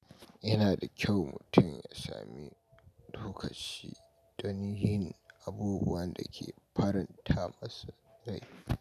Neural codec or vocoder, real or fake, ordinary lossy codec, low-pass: none; real; none; 14.4 kHz